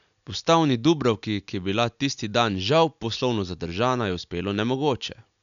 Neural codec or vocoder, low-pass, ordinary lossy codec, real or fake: none; 7.2 kHz; none; real